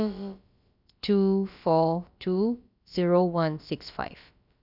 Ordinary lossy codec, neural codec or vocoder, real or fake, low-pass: none; codec, 16 kHz, about 1 kbps, DyCAST, with the encoder's durations; fake; 5.4 kHz